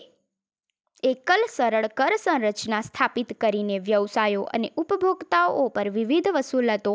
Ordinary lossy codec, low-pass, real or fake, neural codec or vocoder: none; none; real; none